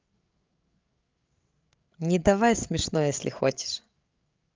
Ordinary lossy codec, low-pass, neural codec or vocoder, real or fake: Opus, 24 kbps; 7.2 kHz; codec, 16 kHz, 8 kbps, FreqCodec, larger model; fake